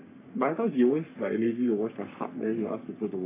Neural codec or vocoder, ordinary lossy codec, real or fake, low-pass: codec, 44.1 kHz, 3.4 kbps, Pupu-Codec; AAC, 24 kbps; fake; 3.6 kHz